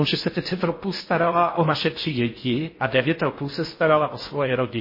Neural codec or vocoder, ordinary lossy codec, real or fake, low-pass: codec, 16 kHz in and 24 kHz out, 0.6 kbps, FocalCodec, streaming, 4096 codes; MP3, 24 kbps; fake; 5.4 kHz